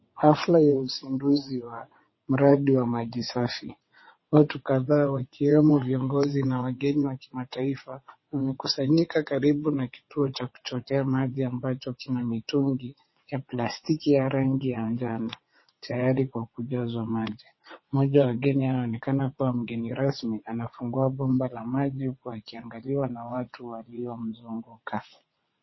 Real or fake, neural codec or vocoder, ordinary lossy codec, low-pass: fake; vocoder, 22.05 kHz, 80 mel bands, WaveNeXt; MP3, 24 kbps; 7.2 kHz